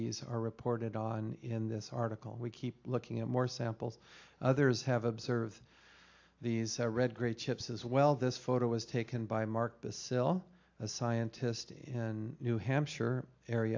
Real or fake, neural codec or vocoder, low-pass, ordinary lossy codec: real; none; 7.2 kHz; AAC, 48 kbps